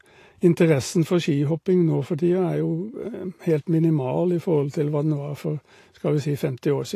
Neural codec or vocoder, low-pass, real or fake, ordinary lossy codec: none; 14.4 kHz; real; AAC, 48 kbps